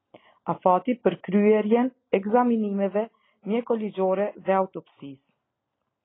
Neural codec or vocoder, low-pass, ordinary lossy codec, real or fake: none; 7.2 kHz; AAC, 16 kbps; real